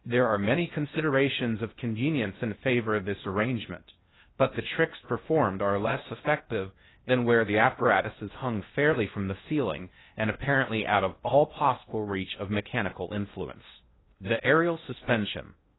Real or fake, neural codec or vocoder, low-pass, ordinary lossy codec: fake; codec, 16 kHz in and 24 kHz out, 0.6 kbps, FocalCodec, streaming, 2048 codes; 7.2 kHz; AAC, 16 kbps